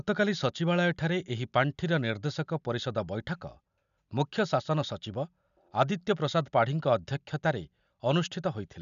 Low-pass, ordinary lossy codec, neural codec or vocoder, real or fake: 7.2 kHz; none; none; real